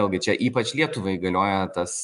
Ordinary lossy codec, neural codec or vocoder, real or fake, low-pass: MP3, 96 kbps; none; real; 10.8 kHz